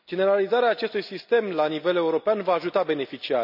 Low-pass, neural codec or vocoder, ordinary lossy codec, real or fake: 5.4 kHz; none; none; real